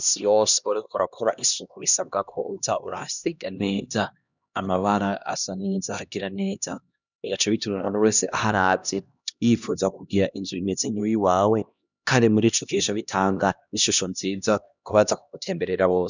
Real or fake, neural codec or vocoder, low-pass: fake; codec, 16 kHz, 1 kbps, X-Codec, HuBERT features, trained on LibriSpeech; 7.2 kHz